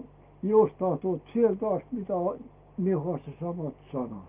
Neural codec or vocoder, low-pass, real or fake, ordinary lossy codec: none; 3.6 kHz; real; Opus, 32 kbps